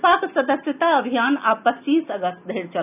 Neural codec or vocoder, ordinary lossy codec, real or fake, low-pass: none; none; real; 3.6 kHz